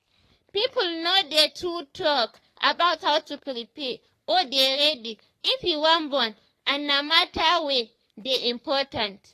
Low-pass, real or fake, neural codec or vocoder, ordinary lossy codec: 14.4 kHz; fake; codec, 44.1 kHz, 3.4 kbps, Pupu-Codec; AAC, 48 kbps